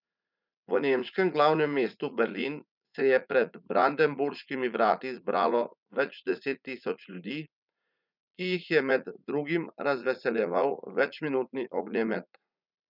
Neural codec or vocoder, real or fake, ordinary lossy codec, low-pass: vocoder, 44.1 kHz, 80 mel bands, Vocos; fake; none; 5.4 kHz